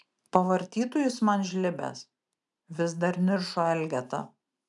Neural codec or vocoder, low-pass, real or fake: none; 10.8 kHz; real